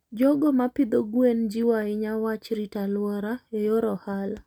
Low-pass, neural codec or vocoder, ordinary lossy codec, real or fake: 19.8 kHz; none; none; real